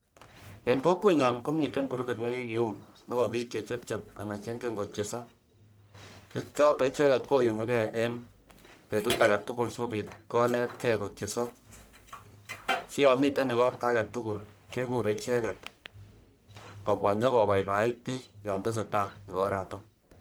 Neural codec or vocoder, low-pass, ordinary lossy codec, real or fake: codec, 44.1 kHz, 1.7 kbps, Pupu-Codec; none; none; fake